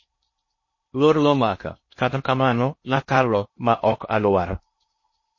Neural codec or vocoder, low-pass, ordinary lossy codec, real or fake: codec, 16 kHz in and 24 kHz out, 0.6 kbps, FocalCodec, streaming, 4096 codes; 7.2 kHz; MP3, 32 kbps; fake